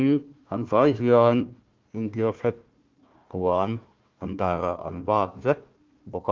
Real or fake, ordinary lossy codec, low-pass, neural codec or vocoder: fake; Opus, 32 kbps; 7.2 kHz; codec, 16 kHz, 1 kbps, FunCodec, trained on Chinese and English, 50 frames a second